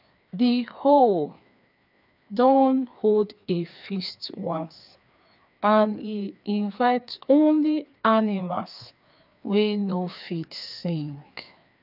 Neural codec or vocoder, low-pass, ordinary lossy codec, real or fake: codec, 16 kHz, 2 kbps, FreqCodec, larger model; 5.4 kHz; none; fake